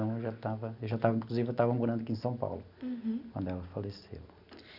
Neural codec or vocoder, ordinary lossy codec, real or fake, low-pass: none; none; real; 5.4 kHz